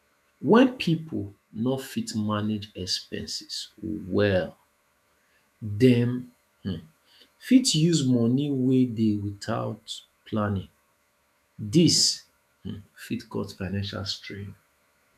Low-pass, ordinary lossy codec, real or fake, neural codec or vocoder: 14.4 kHz; none; fake; autoencoder, 48 kHz, 128 numbers a frame, DAC-VAE, trained on Japanese speech